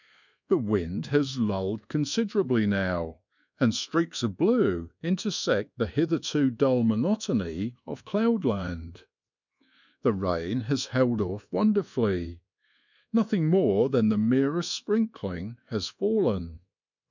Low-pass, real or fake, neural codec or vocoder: 7.2 kHz; fake; codec, 24 kHz, 1.2 kbps, DualCodec